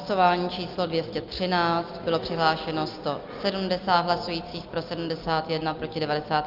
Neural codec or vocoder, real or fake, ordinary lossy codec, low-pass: none; real; Opus, 24 kbps; 5.4 kHz